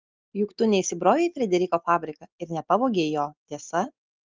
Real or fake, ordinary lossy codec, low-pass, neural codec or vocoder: real; Opus, 32 kbps; 7.2 kHz; none